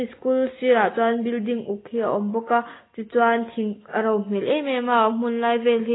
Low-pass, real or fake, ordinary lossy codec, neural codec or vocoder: 7.2 kHz; real; AAC, 16 kbps; none